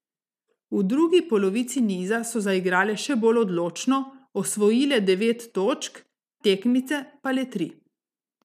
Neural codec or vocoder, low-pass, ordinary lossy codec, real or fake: none; 14.4 kHz; none; real